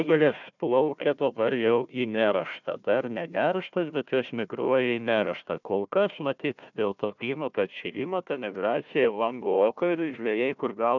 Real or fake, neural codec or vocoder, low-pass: fake; codec, 16 kHz, 1 kbps, FunCodec, trained on Chinese and English, 50 frames a second; 7.2 kHz